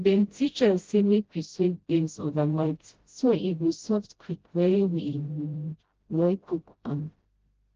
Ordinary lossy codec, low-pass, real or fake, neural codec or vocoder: Opus, 16 kbps; 7.2 kHz; fake; codec, 16 kHz, 0.5 kbps, FreqCodec, smaller model